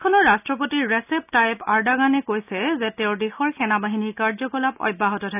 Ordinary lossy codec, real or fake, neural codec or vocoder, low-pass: none; real; none; 3.6 kHz